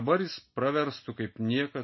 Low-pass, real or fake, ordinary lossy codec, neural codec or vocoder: 7.2 kHz; real; MP3, 24 kbps; none